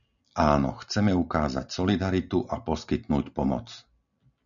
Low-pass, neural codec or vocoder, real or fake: 7.2 kHz; none; real